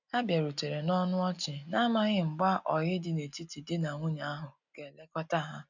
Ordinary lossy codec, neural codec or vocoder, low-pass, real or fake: none; none; 7.2 kHz; real